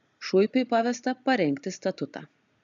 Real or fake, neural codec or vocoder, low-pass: real; none; 7.2 kHz